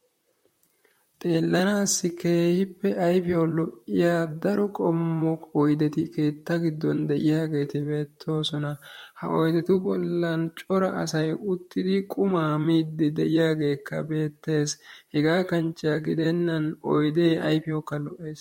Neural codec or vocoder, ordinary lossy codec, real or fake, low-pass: vocoder, 44.1 kHz, 128 mel bands, Pupu-Vocoder; MP3, 64 kbps; fake; 19.8 kHz